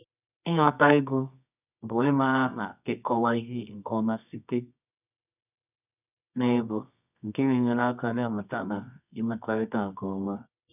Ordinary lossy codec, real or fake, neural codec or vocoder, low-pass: none; fake; codec, 24 kHz, 0.9 kbps, WavTokenizer, medium music audio release; 3.6 kHz